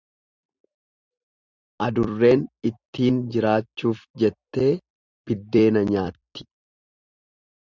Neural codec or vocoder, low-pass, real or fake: vocoder, 44.1 kHz, 128 mel bands every 256 samples, BigVGAN v2; 7.2 kHz; fake